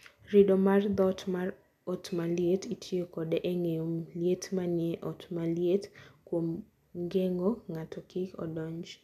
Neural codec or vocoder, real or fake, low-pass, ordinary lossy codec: none; real; 14.4 kHz; none